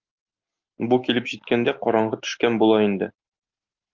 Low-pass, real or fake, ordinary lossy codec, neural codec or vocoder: 7.2 kHz; real; Opus, 24 kbps; none